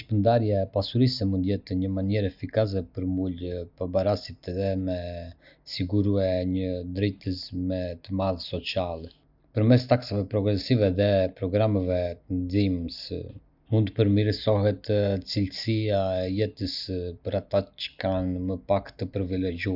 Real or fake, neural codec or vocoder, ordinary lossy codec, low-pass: real; none; none; 5.4 kHz